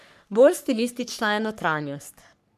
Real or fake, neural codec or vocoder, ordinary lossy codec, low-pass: fake; codec, 44.1 kHz, 3.4 kbps, Pupu-Codec; none; 14.4 kHz